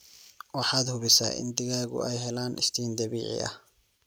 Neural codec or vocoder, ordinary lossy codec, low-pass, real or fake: none; none; none; real